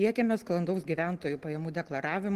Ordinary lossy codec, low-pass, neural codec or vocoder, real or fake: Opus, 16 kbps; 14.4 kHz; none; real